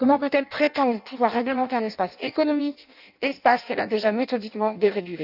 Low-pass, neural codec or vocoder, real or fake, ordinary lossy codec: 5.4 kHz; codec, 16 kHz in and 24 kHz out, 0.6 kbps, FireRedTTS-2 codec; fake; none